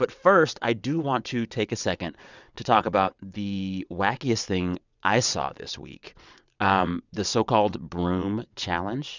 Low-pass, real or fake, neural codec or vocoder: 7.2 kHz; fake; vocoder, 22.05 kHz, 80 mel bands, WaveNeXt